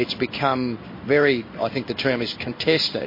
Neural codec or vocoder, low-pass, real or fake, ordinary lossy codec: codec, 16 kHz in and 24 kHz out, 1 kbps, XY-Tokenizer; 5.4 kHz; fake; MP3, 24 kbps